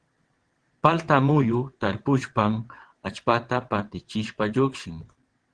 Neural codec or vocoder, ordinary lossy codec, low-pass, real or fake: vocoder, 22.05 kHz, 80 mel bands, WaveNeXt; Opus, 16 kbps; 9.9 kHz; fake